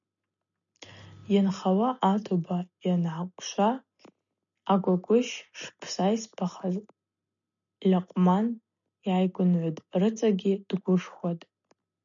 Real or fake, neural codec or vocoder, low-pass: real; none; 7.2 kHz